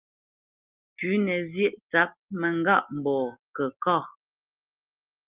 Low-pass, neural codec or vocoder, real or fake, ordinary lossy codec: 3.6 kHz; none; real; Opus, 32 kbps